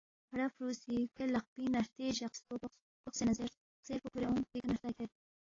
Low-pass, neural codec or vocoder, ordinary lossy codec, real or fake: 7.2 kHz; none; AAC, 32 kbps; real